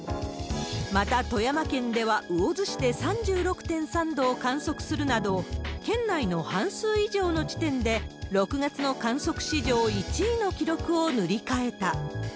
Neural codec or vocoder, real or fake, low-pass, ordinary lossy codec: none; real; none; none